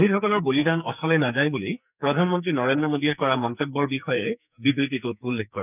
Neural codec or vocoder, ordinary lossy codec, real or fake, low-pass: codec, 44.1 kHz, 2.6 kbps, SNAC; none; fake; 3.6 kHz